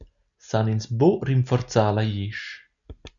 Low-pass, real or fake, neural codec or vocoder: 7.2 kHz; real; none